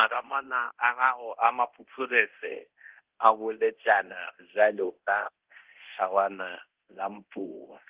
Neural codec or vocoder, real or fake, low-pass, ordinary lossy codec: codec, 24 kHz, 0.9 kbps, DualCodec; fake; 3.6 kHz; Opus, 16 kbps